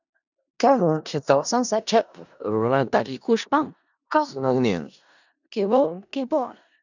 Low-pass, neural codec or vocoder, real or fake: 7.2 kHz; codec, 16 kHz in and 24 kHz out, 0.4 kbps, LongCat-Audio-Codec, four codebook decoder; fake